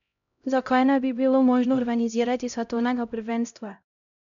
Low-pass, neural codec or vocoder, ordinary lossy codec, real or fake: 7.2 kHz; codec, 16 kHz, 0.5 kbps, X-Codec, HuBERT features, trained on LibriSpeech; none; fake